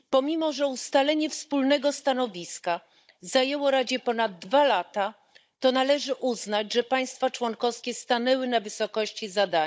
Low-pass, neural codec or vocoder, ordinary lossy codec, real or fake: none; codec, 16 kHz, 16 kbps, FunCodec, trained on Chinese and English, 50 frames a second; none; fake